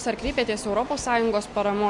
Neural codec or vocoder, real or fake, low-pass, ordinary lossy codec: none; real; 10.8 kHz; MP3, 64 kbps